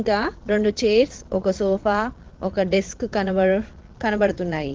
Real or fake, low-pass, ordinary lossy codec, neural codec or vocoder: fake; 7.2 kHz; Opus, 16 kbps; vocoder, 22.05 kHz, 80 mel bands, Vocos